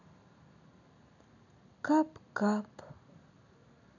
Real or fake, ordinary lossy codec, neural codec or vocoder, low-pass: real; none; none; 7.2 kHz